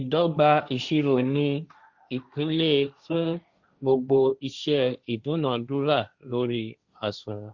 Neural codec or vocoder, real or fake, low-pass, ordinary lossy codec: codec, 16 kHz, 1.1 kbps, Voila-Tokenizer; fake; 7.2 kHz; Opus, 64 kbps